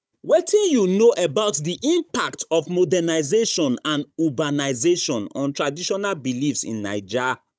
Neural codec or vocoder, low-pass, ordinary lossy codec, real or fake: codec, 16 kHz, 16 kbps, FunCodec, trained on Chinese and English, 50 frames a second; none; none; fake